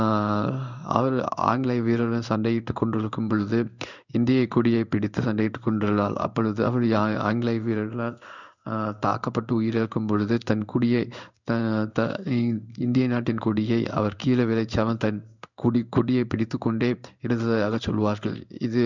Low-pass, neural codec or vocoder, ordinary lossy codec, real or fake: 7.2 kHz; codec, 16 kHz in and 24 kHz out, 1 kbps, XY-Tokenizer; none; fake